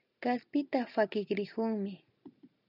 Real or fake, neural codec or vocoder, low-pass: real; none; 5.4 kHz